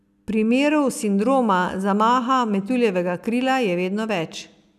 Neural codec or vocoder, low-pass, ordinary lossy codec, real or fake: none; 14.4 kHz; none; real